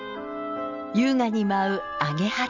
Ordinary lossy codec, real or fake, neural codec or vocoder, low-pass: none; real; none; 7.2 kHz